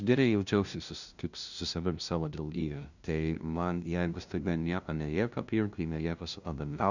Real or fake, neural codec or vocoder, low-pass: fake; codec, 16 kHz, 0.5 kbps, FunCodec, trained on LibriTTS, 25 frames a second; 7.2 kHz